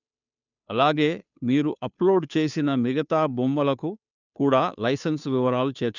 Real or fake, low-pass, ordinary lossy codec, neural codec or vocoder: fake; 7.2 kHz; none; codec, 16 kHz, 2 kbps, FunCodec, trained on Chinese and English, 25 frames a second